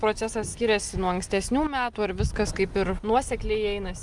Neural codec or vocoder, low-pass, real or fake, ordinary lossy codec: none; 10.8 kHz; real; Opus, 32 kbps